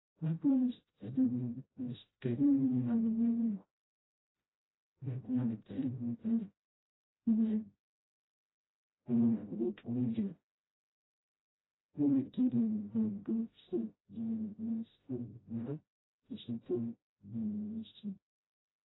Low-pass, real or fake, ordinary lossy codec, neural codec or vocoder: 7.2 kHz; fake; AAC, 16 kbps; codec, 16 kHz, 0.5 kbps, FreqCodec, smaller model